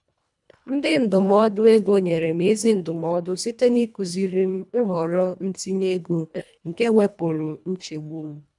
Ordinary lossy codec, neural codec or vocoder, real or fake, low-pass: none; codec, 24 kHz, 1.5 kbps, HILCodec; fake; 10.8 kHz